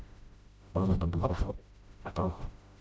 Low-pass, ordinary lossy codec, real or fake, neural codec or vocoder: none; none; fake; codec, 16 kHz, 0.5 kbps, FreqCodec, smaller model